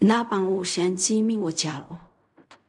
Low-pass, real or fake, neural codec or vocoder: 10.8 kHz; fake; codec, 16 kHz in and 24 kHz out, 0.4 kbps, LongCat-Audio-Codec, fine tuned four codebook decoder